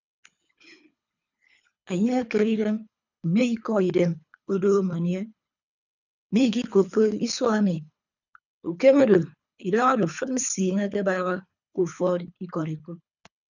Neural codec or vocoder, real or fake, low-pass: codec, 24 kHz, 3 kbps, HILCodec; fake; 7.2 kHz